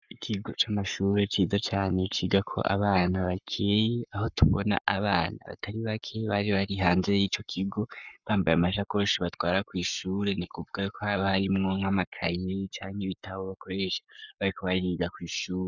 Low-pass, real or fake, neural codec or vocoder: 7.2 kHz; fake; codec, 44.1 kHz, 7.8 kbps, Pupu-Codec